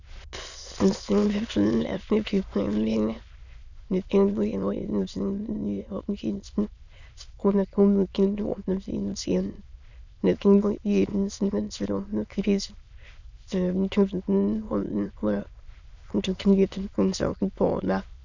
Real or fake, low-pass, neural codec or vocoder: fake; 7.2 kHz; autoencoder, 22.05 kHz, a latent of 192 numbers a frame, VITS, trained on many speakers